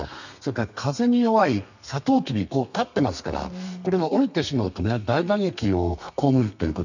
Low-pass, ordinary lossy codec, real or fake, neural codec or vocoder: 7.2 kHz; none; fake; codec, 32 kHz, 1.9 kbps, SNAC